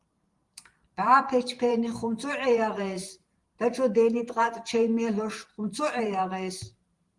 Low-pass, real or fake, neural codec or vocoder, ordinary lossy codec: 10.8 kHz; fake; vocoder, 44.1 kHz, 128 mel bands every 512 samples, BigVGAN v2; Opus, 24 kbps